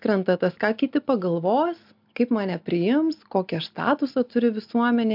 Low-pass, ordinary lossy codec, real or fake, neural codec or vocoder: 5.4 kHz; MP3, 48 kbps; real; none